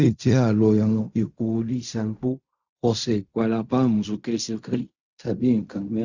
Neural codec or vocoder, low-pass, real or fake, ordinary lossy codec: codec, 16 kHz in and 24 kHz out, 0.4 kbps, LongCat-Audio-Codec, fine tuned four codebook decoder; 7.2 kHz; fake; Opus, 64 kbps